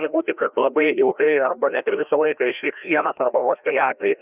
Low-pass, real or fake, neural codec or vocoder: 3.6 kHz; fake; codec, 16 kHz, 1 kbps, FreqCodec, larger model